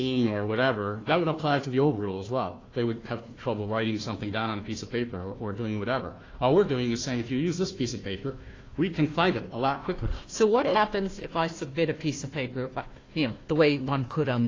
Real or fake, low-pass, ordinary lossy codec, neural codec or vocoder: fake; 7.2 kHz; AAC, 32 kbps; codec, 16 kHz, 1 kbps, FunCodec, trained on Chinese and English, 50 frames a second